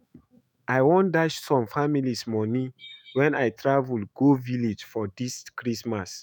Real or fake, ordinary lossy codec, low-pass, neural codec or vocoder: fake; none; none; autoencoder, 48 kHz, 128 numbers a frame, DAC-VAE, trained on Japanese speech